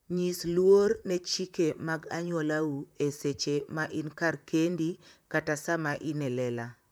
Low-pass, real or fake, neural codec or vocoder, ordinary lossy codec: none; fake; vocoder, 44.1 kHz, 128 mel bands, Pupu-Vocoder; none